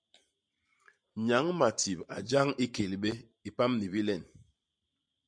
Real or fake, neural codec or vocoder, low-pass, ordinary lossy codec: fake; vocoder, 44.1 kHz, 128 mel bands every 512 samples, BigVGAN v2; 9.9 kHz; MP3, 64 kbps